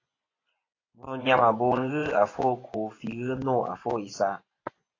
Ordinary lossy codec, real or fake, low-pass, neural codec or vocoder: AAC, 32 kbps; fake; 7.2 kHz; vocoder, 24 kHz, 100 mel bands, Vocos